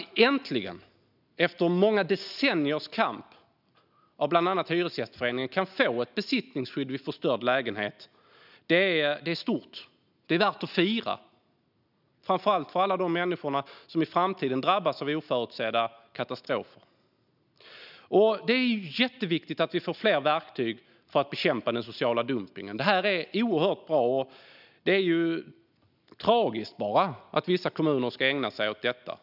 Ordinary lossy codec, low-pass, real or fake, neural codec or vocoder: none; 5.4 kHz; real; none